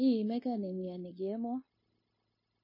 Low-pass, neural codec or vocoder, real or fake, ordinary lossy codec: 5.4 kHz; codec, 16 kHz, 0.9 kbps, LongCat-Audio-Codec; fake; MP3, 24 kbps